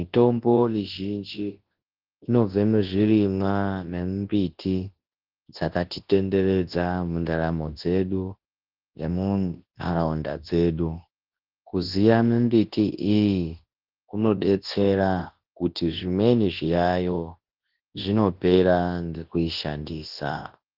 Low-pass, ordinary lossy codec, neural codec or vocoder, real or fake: 5.4 kHz; Opus, 16 kbps; codec, 24 kHz, 0.9 kbps, WavTokenizer, large speech release; fake